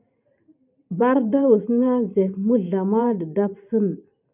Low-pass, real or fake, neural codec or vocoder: 3.6 kHz; fake; vocoder, 44.1 kHz, 128 mel bands every 512 samples, BigVGAN v2